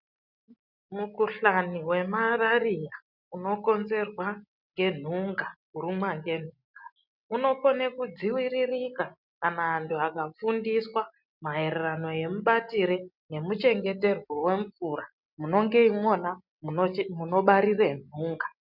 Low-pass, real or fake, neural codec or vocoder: 5.4 kHz; real; none